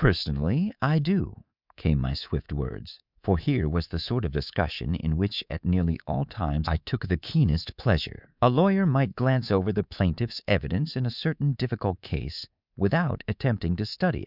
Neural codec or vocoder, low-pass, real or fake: codec, 24 kHz, 3.1 kbps, DualCodec; 5.4 kHz; fake